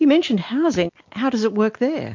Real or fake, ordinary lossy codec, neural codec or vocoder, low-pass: real; MP3, 48 kbps; none; 7.2 kHz